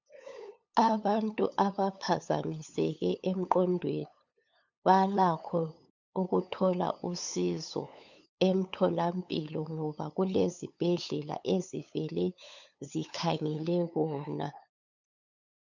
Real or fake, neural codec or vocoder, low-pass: fake; codec, 16 kHz, 8 kbps, FunCodec, trained on LibriTTS, 25 frames a second; 7.2 kHz